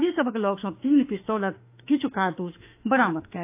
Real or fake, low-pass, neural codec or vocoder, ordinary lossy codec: fake; 3.6 kHz; autoencoder, 48 kHz, 32 numbers a frame, DAC-VAE, trained on Japanese speech; AAC, 24 kbps